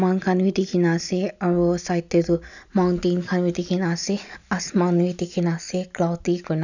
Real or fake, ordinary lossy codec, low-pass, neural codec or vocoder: real; none; 7.2 kHz; none